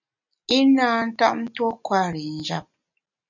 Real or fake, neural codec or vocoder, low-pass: real; none; 7.2 kHz